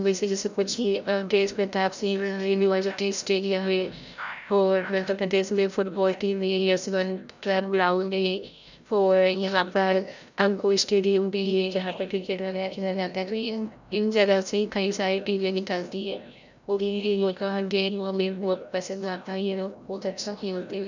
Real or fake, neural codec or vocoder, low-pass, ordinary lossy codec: fake; codec, 16 kHz, 0.5 kbps, FreqCodec, larger model; 7.2 kHz; none